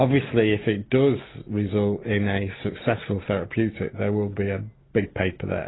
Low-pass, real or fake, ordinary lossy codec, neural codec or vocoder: 7.2 kHz; real; AAC, 16 kbps; none